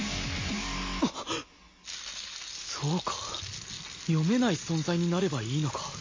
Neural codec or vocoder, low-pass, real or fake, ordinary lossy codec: none; 7.2 kHz; real; MP3, 32 kbps